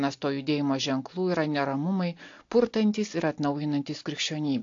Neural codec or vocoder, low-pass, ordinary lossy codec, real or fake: none; 7.2 kHz; AAC, 48 kbps; real